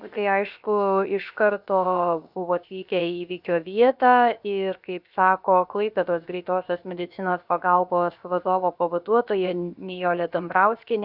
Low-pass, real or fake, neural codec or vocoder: 5.4 kHz; fake; codec, 16 kHz, 0.7 kbps, FocalCodec